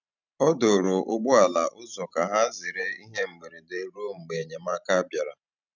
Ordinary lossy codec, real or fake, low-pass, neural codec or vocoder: none; fake; 7.2 kHz; vocoder, 44.1 kHz, 128 mel bands every 512 samples, BigVGAN v2